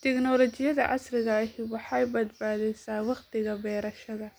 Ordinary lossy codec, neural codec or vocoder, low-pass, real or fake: none; none; none; real